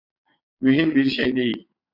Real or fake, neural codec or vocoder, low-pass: fake; vocoder, 22.05 kHz, 80 mel bands, Vocos; 5.4 kHz